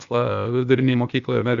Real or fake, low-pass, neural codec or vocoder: fake; 7.2 kHz; codec, 16 kHz, 0.8 kbps, ZipCodec